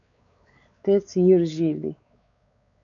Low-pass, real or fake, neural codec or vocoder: 7.2 kHz; fake; codec, 16 kHz, 4 kbps, X-Codec, WavLM features, trained on Multilingual LibriSpeech